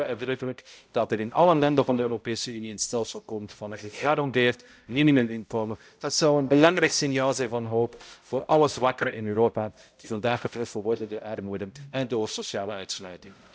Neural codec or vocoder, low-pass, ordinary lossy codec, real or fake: codec, 16 kHz, 0.5 kbps, X-Codec, HuBERT features, trained on balanced general audio; none; none; fake